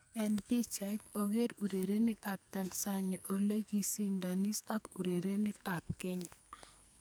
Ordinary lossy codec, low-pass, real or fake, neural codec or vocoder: none; none; fake; codec, 44.1 kHz, 2.6 kbps, SNAC